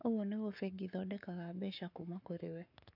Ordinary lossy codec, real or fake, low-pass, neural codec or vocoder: MP3, 48 kbps; fake; 5.4 kHz; codec, 44.1 kHz, 7.8 kbps, Pupu-Codec